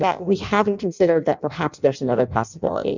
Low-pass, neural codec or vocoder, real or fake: 7.2 kHz; codec, 16 kHz in and 24 kHz out, 0.6 kbps, FireRedTTS-2 codec; fake